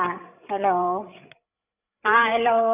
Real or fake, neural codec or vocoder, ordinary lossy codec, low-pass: fake; codec, 16 kHz, 8 kbps, FreqCodec, larger model; none; 3.6 kHz